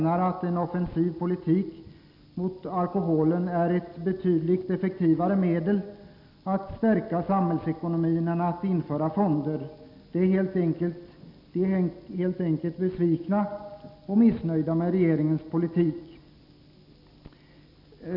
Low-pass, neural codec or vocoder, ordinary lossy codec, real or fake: 5.4 kHz; none; none; real